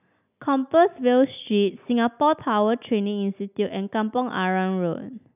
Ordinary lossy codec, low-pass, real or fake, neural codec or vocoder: none; 3.6 kHz; real; none